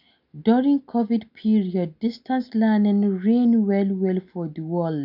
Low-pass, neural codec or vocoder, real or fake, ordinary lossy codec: 5.4 kHz; none; real; none